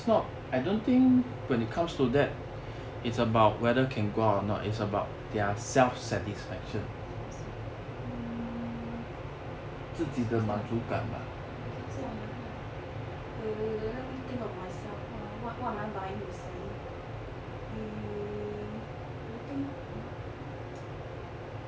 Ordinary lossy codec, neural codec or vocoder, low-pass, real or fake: none; none; none; real